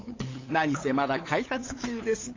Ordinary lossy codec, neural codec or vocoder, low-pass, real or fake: AAC, 32 kbps; codec, 16 kHz, 8 kbps, FunCodec, trained on LibriTTS, 25 frames a second; 7.2 kHz; fake